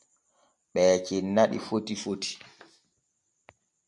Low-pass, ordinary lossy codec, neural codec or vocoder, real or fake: 10.8 kHz; MP3, 64 kbps; none; real